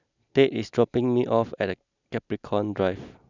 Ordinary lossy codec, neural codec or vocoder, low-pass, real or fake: none; none; 7.2 kHz; real